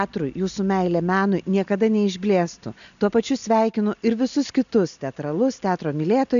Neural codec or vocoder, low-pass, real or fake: none; 7.2 kHz; real